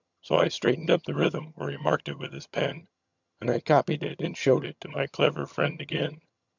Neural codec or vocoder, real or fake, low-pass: vocoder, 22.05 kHz, 80 mel bands, HiFi-GAN; fake; 7.2 kHz